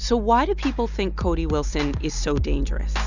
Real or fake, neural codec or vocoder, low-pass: real; none; 7.2 kHz